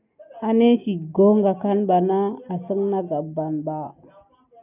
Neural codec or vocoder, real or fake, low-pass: none; real; 3.6 kHz